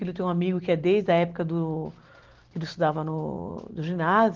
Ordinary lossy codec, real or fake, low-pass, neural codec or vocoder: Opus, 16 kbps; real; 7.2 kHz; none